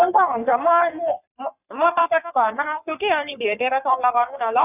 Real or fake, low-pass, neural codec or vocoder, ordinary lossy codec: fake; 3.6 kHz; codec, 44.1 kHz, 3.4 kbps, Pupu-Codec; none